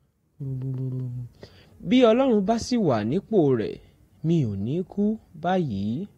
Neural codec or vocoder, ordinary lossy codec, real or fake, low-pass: none; AAC, 48 kbps; real; 19.8 kHz